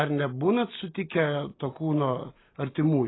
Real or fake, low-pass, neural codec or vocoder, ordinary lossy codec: real; 7.2 kHz; none; AAC, 16 kbps